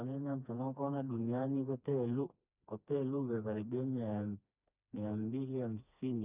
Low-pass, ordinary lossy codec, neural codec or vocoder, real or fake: 3.6 kHz; none; codec, 16 kHz, 2 kbps, FreqCodec, smaller model; fake